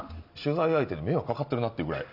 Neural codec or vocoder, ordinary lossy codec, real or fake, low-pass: none; none; real; 5.4 kHz